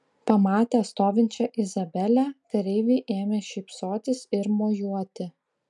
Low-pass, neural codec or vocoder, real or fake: 10.8 kHz; none; real